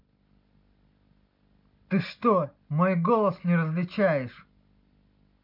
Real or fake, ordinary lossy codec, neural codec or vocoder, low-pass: real; MP3, 48 kbps; none; 5.4 kHz